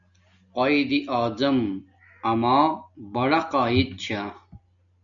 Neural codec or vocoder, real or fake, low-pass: none; real; 7.2 kHz